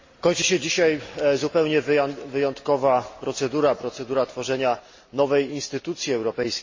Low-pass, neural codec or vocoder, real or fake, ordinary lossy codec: 7.2 kHz; none; real; MP3, 32 kbps